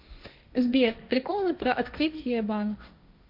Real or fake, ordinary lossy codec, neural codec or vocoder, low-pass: fake; MP3, 48 kbps; codec, 16 kHz, 1.1 kbps, Voila-Tokenizer; 5.4 kHz